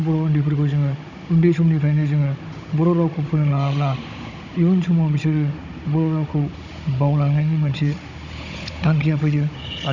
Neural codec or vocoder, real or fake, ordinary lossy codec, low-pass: codec, 16 kHz, 16 kbps, FunCodec, trained on Chinese and English, 50 frames a second; fake; none; 7.2 kHz